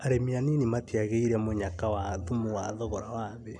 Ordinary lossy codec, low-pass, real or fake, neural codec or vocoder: none; 9.9 kHz; real; none